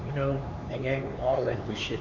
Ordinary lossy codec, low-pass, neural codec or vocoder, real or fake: none; 7.2 kHz; codec, 16 kHz, 4 kbps, X-Codec, HuBERT features, trained on LibriSpeech; fake